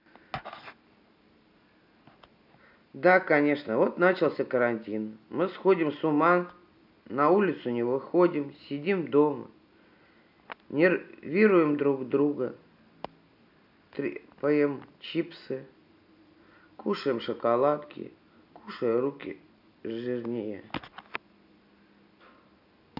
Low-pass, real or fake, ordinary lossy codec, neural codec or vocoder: 5.4 kHz; real; none; none